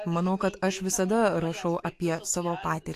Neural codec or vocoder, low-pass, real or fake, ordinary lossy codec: autoencoder, 48 kHz, 128 numbers a frame, DAC-VAE, trained on Japanese speech; 14.4 kHz; fake; AAC, 64 kbps